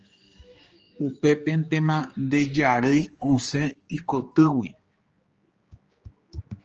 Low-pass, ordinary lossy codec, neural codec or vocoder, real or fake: 7.2 kHz; Opus, 24 kbps; codec, 16 kHz, 2 kbps, X-Codec, HuBERT features, trained on general audio; fake